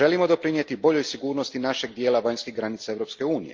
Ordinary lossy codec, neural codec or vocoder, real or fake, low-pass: Opus, 24 kbps; none; real; 7.2 kHz